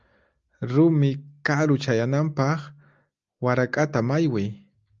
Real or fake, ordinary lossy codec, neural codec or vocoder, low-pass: real; Opus, 32 kbps; none; 7.2 kHz